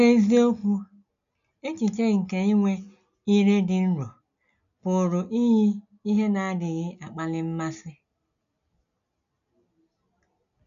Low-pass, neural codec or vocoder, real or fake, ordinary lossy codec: 7.2 kHz; none; real; none